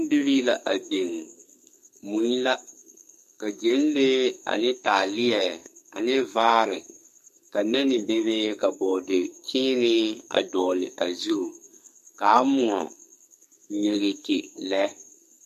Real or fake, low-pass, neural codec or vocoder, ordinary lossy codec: fake; 14.4 kHz; codec, 44.1 kHz, 2.6 kbps, SNAC; MP3, 64 kbps